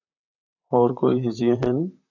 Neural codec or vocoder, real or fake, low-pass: vocoder, 44.1 kHz, 128 mel bands, Pupu-Vocoder; fake; 7.2 kHz